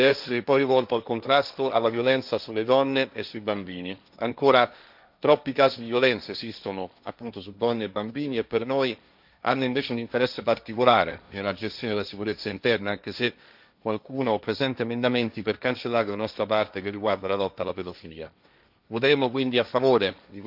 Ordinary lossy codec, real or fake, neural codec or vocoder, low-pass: none; fake; codec, 16 kHz, 1.1 kbps, Voila-Tokenizer; 5.4 kHz